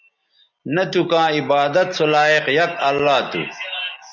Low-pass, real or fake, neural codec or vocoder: 7.2 kHz; real; none